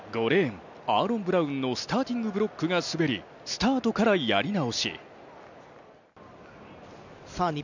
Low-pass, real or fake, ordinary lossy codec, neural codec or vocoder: 7.2 kHz; real; none; none